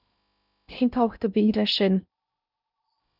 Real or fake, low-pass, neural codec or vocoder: fake; 5.4 kHz; codec, 16 kHz in and 24 kHz out, 0.6 kbps, FocalCodec, streaming, 2048 codes